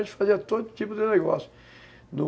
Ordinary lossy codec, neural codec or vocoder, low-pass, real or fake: none; none; none; real